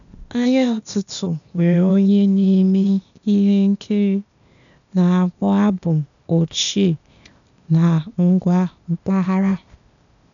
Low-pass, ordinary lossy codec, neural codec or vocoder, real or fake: 7.2 kHz; none; codec, 16 kHz, 0.8 kbps, ZipCodec; fake